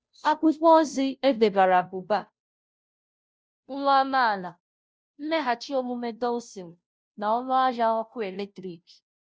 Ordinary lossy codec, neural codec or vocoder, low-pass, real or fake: none; codec, 16 kHz, 0.5 kbps, FunCodec, trained on Chinese and English, 25 frames a second; none; fake